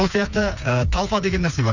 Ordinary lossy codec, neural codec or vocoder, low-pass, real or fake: none; codec, 16 kHz, 4 kbps, FreqCodec, smaller model; 7.2 kHz; fake